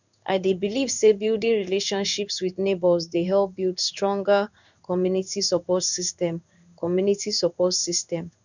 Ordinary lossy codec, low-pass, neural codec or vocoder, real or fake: none; 7.2 kHz; codec, 16 kHz in and 24 kHz out, 1 kbps, XY-Tokenizer; fake